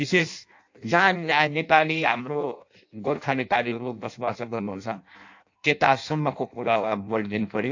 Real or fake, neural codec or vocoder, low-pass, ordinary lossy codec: fake; codec, 16 kHz in and 24 kHz out, 0.6 kbps, FireRedTTS-2 codec; 7.2 kHz; AAC, 48 kbps